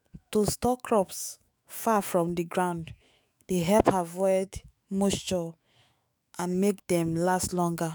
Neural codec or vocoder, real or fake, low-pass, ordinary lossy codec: autoencoder, 48 kHz, 128 numbers a frame, DAC-VAE, trained on Japanese speech; fake; none; none